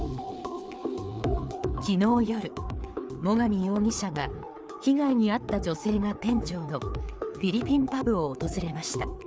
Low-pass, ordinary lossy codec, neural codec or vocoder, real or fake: none; none; codec, 16 kHz, 4 kbps, FreqCodec, larger model; fake